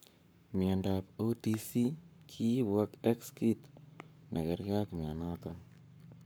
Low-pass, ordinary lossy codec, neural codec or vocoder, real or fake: none; none; codec, 44.1 kHz, 7.8 kbps, Pupu-Codec; fake